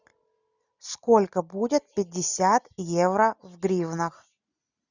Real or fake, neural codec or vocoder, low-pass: real; none; 7.2 kHz